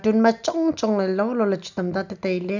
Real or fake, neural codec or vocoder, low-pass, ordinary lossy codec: real; none; 7.2 kHz; none